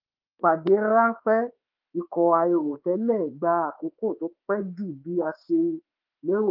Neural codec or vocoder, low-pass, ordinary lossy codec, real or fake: autoencoder, 48 kHz, 32 numbers a frame, DAC-VAE, trained on Japanese speech; 5.4 kHz; Opus, 32 kbps; fake